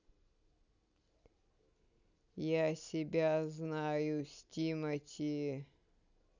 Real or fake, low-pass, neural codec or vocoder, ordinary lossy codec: real; 7.2 kHz; none; none